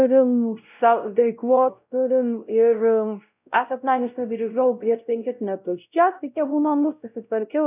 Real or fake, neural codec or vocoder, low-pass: fake; codec, 16 kHz, 0.5 kbps, X-Codec, WavLM features, trained on Multilingual LibriSpeech; 3.6 kHz